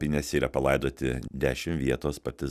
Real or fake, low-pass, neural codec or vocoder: real; 14.4 kHz; none